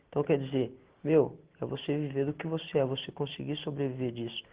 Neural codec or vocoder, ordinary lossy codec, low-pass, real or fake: none; Opus, 16 kbps; 3.6 kHz; real